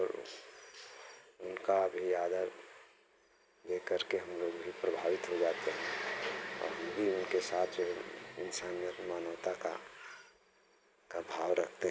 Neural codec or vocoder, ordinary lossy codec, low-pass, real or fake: none; none; none; real